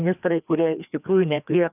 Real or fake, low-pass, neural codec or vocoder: fake; 3.6 kHz; codec, 16 kHz, 2 kbps, FreqCodec, larger model